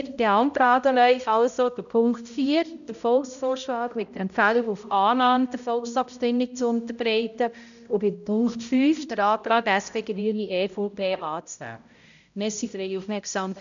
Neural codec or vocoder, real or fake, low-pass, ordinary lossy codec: codec, 16 kHz, 0.5 kbps, X-Codec, HuBERT features, trained on balanced general audio; fake; 7.2 kHz; none